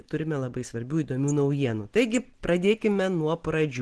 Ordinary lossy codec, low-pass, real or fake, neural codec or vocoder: Opus, 16 kbps; 10.8 kHz; real; none